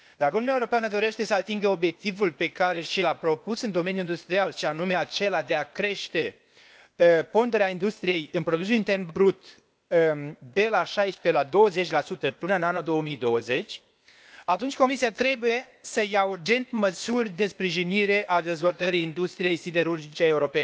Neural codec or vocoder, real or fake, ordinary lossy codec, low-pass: codec, 16 kHz, 0.8 kbps, ZipCodec; fake; none; none